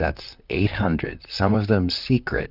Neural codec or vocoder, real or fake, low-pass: vocoder, 44.1 kHz, 128 mel bands, Pupu-Vocoder; fake; 5.4 kHz